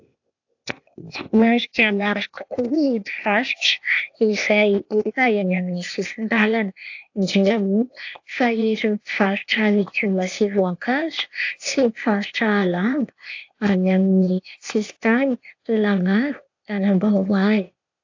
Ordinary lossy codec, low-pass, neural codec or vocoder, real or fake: AAC, 48 kbps; 7.2 kHz; codec, 16 kHz, 0.8 kbps, ZipCodec; fake